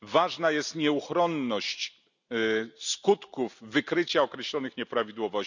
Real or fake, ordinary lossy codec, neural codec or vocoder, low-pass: real; none; none; 7.2 kHz